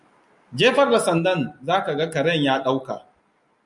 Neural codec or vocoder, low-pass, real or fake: none; 10.8 kHz; real